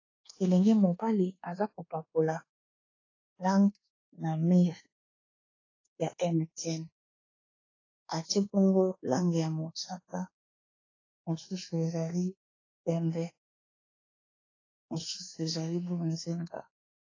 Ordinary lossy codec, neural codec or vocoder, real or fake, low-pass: AAC, 32 kbps; codec, 24 kHz, 1.2 kbps, DualCodec; fake; 7.2 kHz